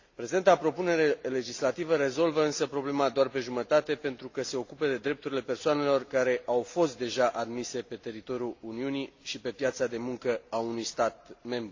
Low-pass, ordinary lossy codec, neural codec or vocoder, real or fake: 7.2 kHz; AAC, 48 kbps; none; real